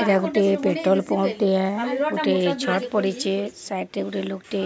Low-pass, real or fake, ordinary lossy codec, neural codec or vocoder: none; real; none; none